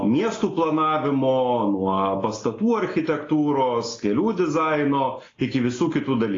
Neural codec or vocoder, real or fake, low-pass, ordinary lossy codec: none; real; 7.2 kHz; AAC, 32 kbps